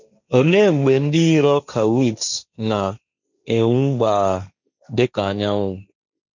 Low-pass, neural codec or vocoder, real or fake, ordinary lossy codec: 7.2 kHz; codec, 16 kHz, 1.1 kbps, Voila-Tokenizer; fake; none